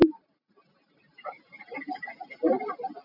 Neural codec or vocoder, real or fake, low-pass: none; real; 5.4 kHz